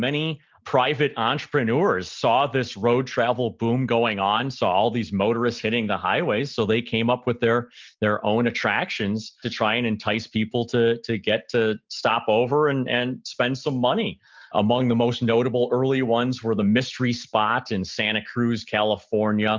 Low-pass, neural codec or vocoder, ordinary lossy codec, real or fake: 7.2 kHz; none; Opus, 24 kbps; real